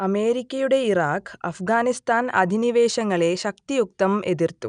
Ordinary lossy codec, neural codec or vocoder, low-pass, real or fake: none; none; 9.9 kHz; real